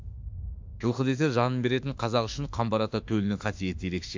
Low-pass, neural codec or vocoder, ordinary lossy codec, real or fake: 7.2 kHz; autoencoder, 48 kHz, 32 numbers a frame, DAC-VAE, trained on Japanese speech; MP3, 64 kbps; fake